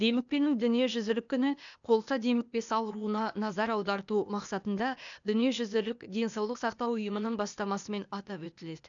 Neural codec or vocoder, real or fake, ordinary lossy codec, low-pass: codec, 16 kHz, 0.8 kbps, ZipCodec; fake; none; 7.2 kHz